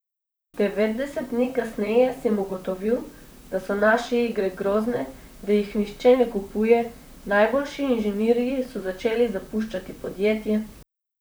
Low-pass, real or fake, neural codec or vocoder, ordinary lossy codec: none; fake; vocoder, 44.1 kHz, 128 mel bands, Pupu-Vocoder; none